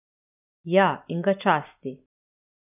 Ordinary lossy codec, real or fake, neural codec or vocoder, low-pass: none; real; none; 3.6 kHz